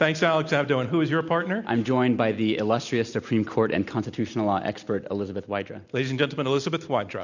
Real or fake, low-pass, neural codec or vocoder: real; 7.2 kHz; none